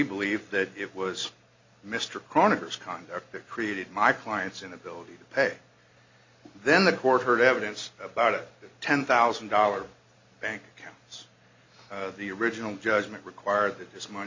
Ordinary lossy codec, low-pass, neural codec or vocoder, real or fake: MP3, 64 kbps; 7.2 kHz; none; real